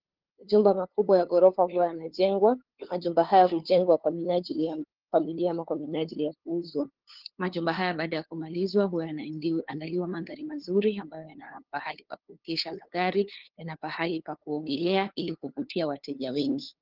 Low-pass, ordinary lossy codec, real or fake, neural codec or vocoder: 5.4 kHz; Opus, 16 kbps; fake; codec, 16 kHz, 2 kbps, FunCodec, trained on LibriTTS, 25 frames a second